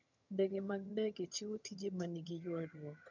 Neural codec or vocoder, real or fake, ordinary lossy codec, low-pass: vocoder, 22.05 kHz, 80 mel bands, HiFi-GAN; fake; none; 7.2 kHz